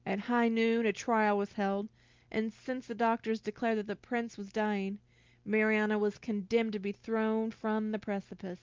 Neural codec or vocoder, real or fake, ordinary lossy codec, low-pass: none; real; Opus, 32 kbps; 7.2 kHz